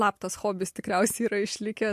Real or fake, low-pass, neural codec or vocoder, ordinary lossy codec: real; 14.4 kHz; none; MP3, 64 kbps